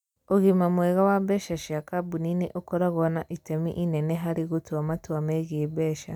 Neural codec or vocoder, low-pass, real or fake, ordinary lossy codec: none; 19.8 kHz; real; none